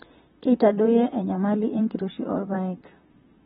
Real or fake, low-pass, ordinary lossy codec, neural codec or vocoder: fake; 19.8 kHz; AAC, 16 kbps; vocoder, 44.1 kHz, 128 mel bands, Pupu-Vocoder